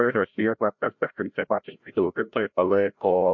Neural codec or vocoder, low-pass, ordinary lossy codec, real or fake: codec, 16 kHz, 0.5 kbps, FreqCodec, larger model; 7.2 kHz; MP3, 48 kbps; fake